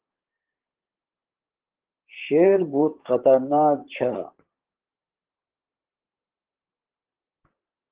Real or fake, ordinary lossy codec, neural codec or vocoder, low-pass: fake; Opus, 16 kbps; vocoder, 44.1 kHz, 128 mel bands, Pupu-Vocoder; 3.6 kHz